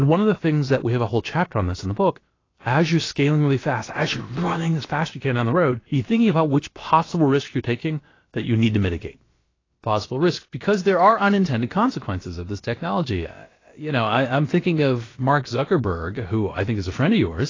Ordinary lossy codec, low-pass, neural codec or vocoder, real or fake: AAC, 32 kbps; 7.2 kHz; codec, 16 kHz, about 1 kbps, DyCAST, with the encoder's durations; fake